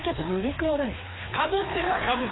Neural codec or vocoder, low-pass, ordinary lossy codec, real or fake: codec, 16 kHz, 2 kbps, FreqCodec, larger model; 7.2 kHz; AAC, 16 kbps; fake